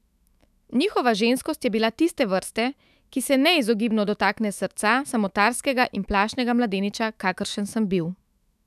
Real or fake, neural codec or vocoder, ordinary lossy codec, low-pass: fake; autoencoder, 48 kHz, 128 numbers a frame, DAC-VAE, trained on Japanese speech; none; 14.4 kHz